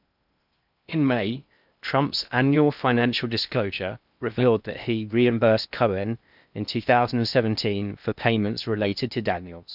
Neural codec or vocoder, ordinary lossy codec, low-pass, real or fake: codec, 16 kHz in and 24 kHz out, 0.6 kbps, FocalCodec, streaming, 2048 codes; none; 5.4 kHz; fake